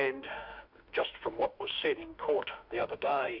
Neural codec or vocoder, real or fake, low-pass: autoencoder, 48 kHz, 32 numbers a frame, DAC-VAE, trained on Japanese speech; fake; 5.4 kHz